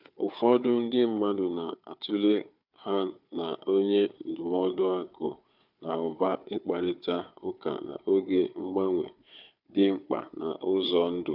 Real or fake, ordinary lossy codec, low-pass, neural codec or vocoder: fake; none; 5.4 kHz; codec, 16 kHz, 4 kbps, FunCodec, trained on Chinese and English, 50 frames a second